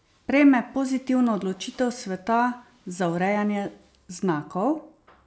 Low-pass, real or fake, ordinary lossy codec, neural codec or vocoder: none; real; none; none